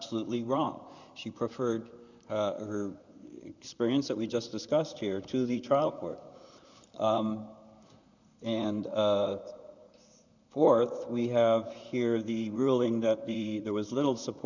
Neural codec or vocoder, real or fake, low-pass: vocoder, 44.1 kHz, 128 mel bands, Pupu-Vocoder; fake; 7.2 kHz